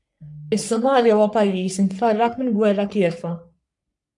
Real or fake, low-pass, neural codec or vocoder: fake; 10.8 kHz; codec, 44.1 kHz, 3.4 kbps, Pupu-Codec